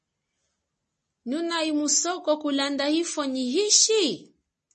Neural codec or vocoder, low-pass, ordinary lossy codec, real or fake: none; 10.8 kHz; MP3, 32 kbps; real